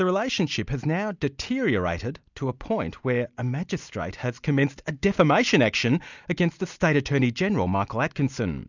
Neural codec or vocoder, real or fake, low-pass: none; real; 7.2 kHz